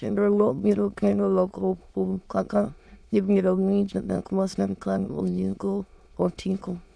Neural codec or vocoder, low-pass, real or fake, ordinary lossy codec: autoencoder, 22.05 kHz, a latent of 192 numbers a frame, VITS, trained on many speakers; none; fake; none